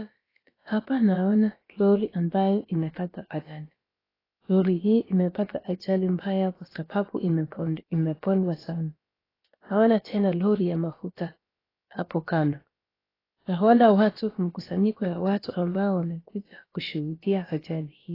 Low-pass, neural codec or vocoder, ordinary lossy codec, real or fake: 5.4 kHz; codec, 16 kHz, about 1 kbps, DyCAST, with the encoder's durations; AAC, 24 kbps; fake